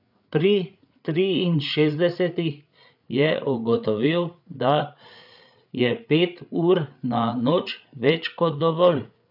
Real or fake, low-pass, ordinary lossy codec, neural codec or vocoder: fake; 5.4 kHz; none; codec, 16 kHz, 8 kbps, FreqCodec, larger model